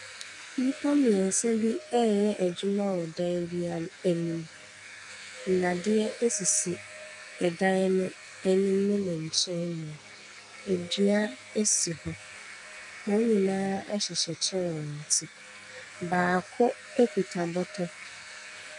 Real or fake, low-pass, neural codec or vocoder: fake; 10.8 kHz; codec, 44.1 kHz, 2.6 kbps, SNAC